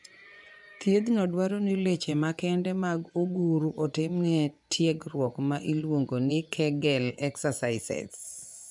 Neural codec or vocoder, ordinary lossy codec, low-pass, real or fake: vocoder, 24 kHz, 100 mel bands, Vocos; none; 10.8 kHz; fake